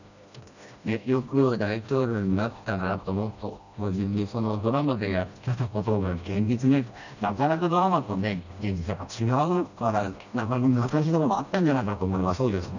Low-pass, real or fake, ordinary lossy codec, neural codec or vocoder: 7.2 kHz; fake; none; codec, 16 kHz, 1 kbps, FreqCodec, smaller model